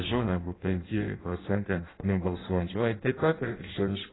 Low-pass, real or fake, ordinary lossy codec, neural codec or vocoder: 7.2 kHz; fake; AAC, 16 kbps; codec, 16 kHz in and 24 kHz out, 0.6 kbps, FireRedTTS-2 codec